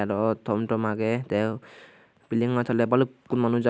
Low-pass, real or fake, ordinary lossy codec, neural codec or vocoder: none; real; none; none